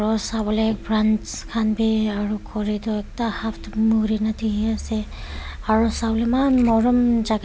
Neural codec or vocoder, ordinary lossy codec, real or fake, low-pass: none; none; real; none